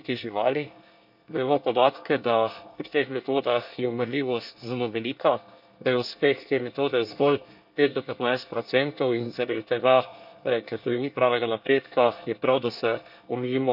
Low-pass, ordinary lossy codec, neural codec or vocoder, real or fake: 5.4 kHz; none; codec, 24 kHz, 1 kbps, SNAC; fake